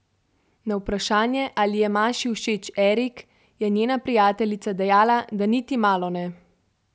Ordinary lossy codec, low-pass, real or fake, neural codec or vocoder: none; none; real; none